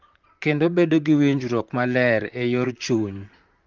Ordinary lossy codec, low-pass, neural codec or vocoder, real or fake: Opus, 32 kbps; 7.2 kHz; vocoder, 44.1 kHz, 128 mel bands, Pupu-Vocoder; fake